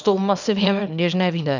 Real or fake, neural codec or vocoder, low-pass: fake; codec, 24 kHz, 0.9 kbps, WavTokenizer, small release; 7.2 kHz